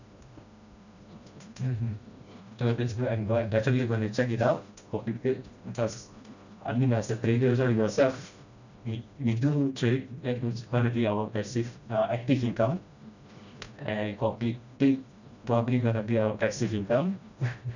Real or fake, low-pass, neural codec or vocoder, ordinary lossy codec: fake; 7.2 kHz; codec, 16 kHz, 1 kbps, FreqCodec, smaller model; none